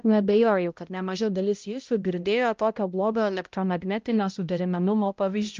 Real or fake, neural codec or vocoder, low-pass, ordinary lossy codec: fake; codec, 16 kHz, 0.5 kbps, X-Codec, HuBERT features, trained on balanced general audio; 7.2 kHz; Opus, 24 kbps